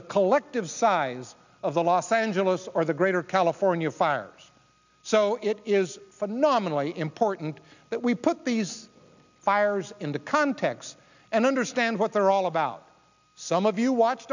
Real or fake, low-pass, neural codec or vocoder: real; 7.2 kHz; none